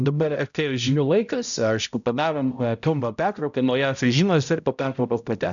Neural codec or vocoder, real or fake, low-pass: codec, 16 kHz, 0.5 kbps, X-Codec, HuBERT features, trained on balanced general audio; fake; 7.2 kHz